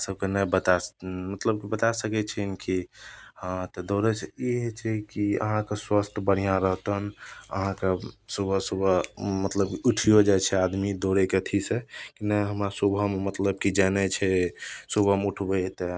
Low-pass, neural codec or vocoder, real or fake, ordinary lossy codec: none; none; real; none